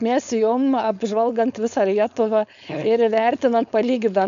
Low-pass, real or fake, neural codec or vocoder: 7.2 kHz; fake; codec, 16 kHz, 4.8 kbps, FACodec